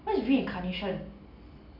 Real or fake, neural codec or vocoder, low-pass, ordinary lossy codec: real; none; 5.4 kHz; Opus, 64 kbps